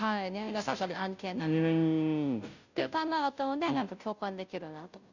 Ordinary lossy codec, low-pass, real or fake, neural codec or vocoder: none; 7.2 kHz; fake; codec, 16 kHz, 0.5 kbps, FunCodec, trained on Chinese and English, 25 frames a second